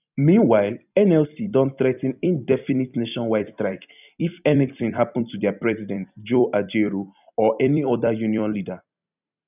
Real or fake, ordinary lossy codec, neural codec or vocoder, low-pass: fake; none; vocoder, 44.1 kHz, 128 mel bands every 256 samples, BigVGAN v2; 3.6 kHz